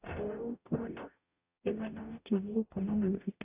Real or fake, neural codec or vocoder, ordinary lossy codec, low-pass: fake; codec, 44.1 kHz, 0.9 kbps, DAC; AAC, 32 kbps; 3.6 kHz